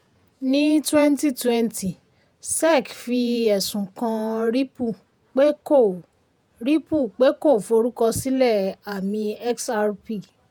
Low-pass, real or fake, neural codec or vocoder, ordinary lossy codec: none; fake; vocoder, 48 kHz, 128 mel bands, Vocos; none